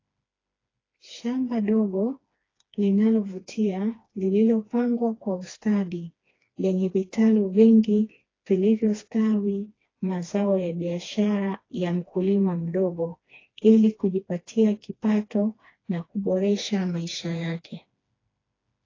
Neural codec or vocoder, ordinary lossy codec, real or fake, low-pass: codec, 16 kHz, 2 kbps, FreqCodec, smaller model; AAC, 32 kbps; fake; 7.2 kHz